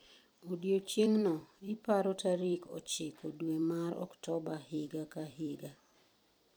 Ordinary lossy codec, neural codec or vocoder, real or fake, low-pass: none; vocoder, 44.1 kHz, 128 mel bands every 256 samples, BigVGAN v2; fake; none